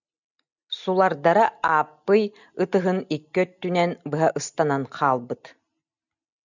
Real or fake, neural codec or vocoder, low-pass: real; none; 7.2 kHz